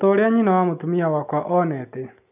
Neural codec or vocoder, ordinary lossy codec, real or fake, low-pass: none; none; real; 3.6 kHz